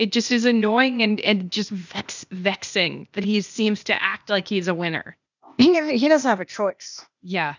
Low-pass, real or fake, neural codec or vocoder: 7.2 kHz; fake; codec, 16 kHz, 0.8 kbps, ZipCodec